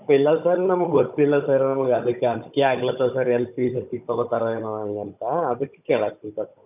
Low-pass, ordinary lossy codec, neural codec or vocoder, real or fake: 3.6 kHz; none; codec, 16 kHz, 16 kbps, FunCodec, trained on Chinese and English, 50 frames a second; fake